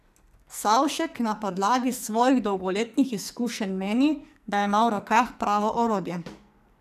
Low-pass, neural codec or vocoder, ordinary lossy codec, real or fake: 14.4 kHz; codec, 44.1 kHz, 2.6 kbps, SNAC; none; fake